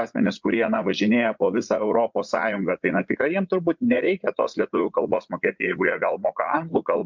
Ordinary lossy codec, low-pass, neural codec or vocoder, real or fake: MP3, 64 kbps; 7.2 kHz; vocoder, 44.1 kHz, 80 mel bands, Vocos; fake